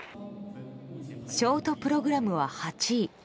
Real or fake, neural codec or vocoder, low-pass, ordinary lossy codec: real; none; none; none